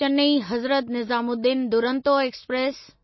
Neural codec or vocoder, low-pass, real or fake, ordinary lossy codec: none; 7.2 kHz; real; MP3, 24 kbps